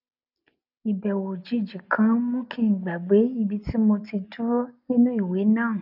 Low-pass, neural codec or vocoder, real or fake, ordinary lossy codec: 5.4 kHz; none; real; none